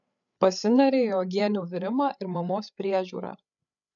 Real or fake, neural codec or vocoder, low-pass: fake; codec, 16 kHz, 8 kbps, FreqCodec, larger model; 7.2 kHz